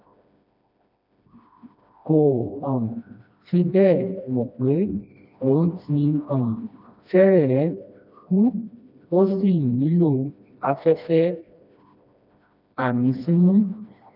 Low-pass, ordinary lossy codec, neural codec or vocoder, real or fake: 5.4 kHz; none; codec, 16 kHz, 1 kbps, FreqCodec, smaller model; fake